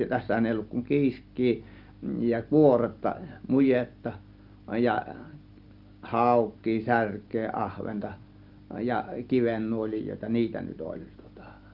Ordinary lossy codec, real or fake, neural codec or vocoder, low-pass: Opus, 24 kbps; real; none; 5.4 kHz